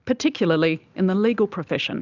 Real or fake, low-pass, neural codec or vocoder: real; 7.2 kHz; none